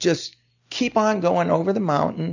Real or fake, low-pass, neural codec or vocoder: real; 7.2 kHz; none